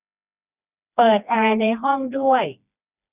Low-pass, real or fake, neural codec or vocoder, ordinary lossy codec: 3.6 kHz; fake; codec, 16 kHz, 2 kbps, FreqCodec, smaller model; none